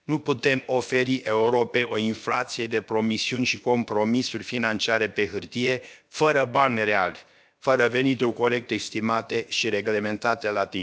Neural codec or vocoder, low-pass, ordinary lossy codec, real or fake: codec, 16 kHz, about 1 kbps, DyCAST, with the encoder's durations; none; none; fake